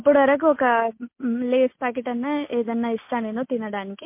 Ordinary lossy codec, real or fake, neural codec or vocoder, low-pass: MP3, 24 kbps; real; none; 3.6 kHz